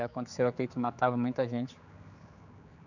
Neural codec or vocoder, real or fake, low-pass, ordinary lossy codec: codec, 16 kHz, 4 kbps, X-Codec, HuBERT features, trained on general audio; fake; 7.2 kHz; none